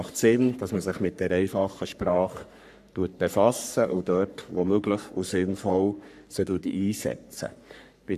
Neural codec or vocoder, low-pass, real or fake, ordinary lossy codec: codec, 44.1 kHz, 3.4 kbps, Pupu-Codec; 14.4 kHz; fake; none